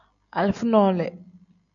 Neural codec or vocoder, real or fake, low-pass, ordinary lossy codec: none; real; 7.2 kHz; MP3, 96 kbps